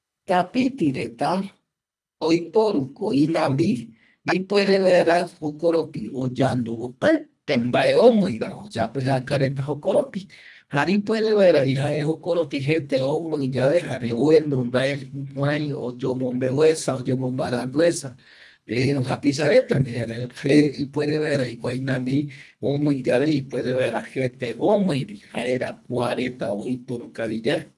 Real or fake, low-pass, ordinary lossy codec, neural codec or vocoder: fake; none; none; codec, 24 kHz, 1.5 kbps, HILCodec